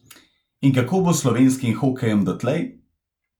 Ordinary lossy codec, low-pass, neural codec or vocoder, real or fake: none; 19.8 kHz; none; real